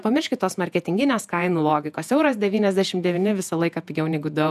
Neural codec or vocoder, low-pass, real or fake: vocoder, 48 kHz, 128 mel bands, Vocos; 14.4 kHz; fake